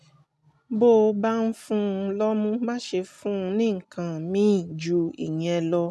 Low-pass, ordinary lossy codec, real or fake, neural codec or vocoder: none; none; real; none